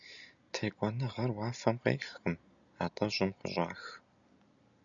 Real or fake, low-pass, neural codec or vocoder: real; 7.2 kHz; none